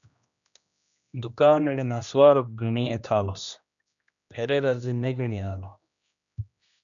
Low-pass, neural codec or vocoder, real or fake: 7.2 kHz; codec, 16 kHz, 1 kbps, X-Codec, HuBERT features, trained on general audio; fake